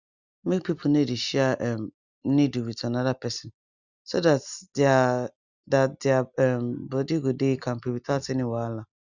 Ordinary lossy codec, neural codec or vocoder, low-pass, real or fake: Opus, 64 kbps; none; 7.2 kHz; real